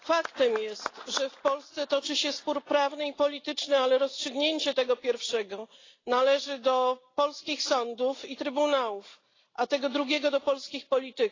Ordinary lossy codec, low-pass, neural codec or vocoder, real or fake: AAC, 32 kbps; 7.2 kHz; none; real